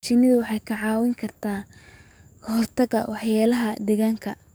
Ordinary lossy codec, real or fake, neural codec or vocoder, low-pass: none; fake; codec, 44.1 kHz, 7.8 kbps, DAC; none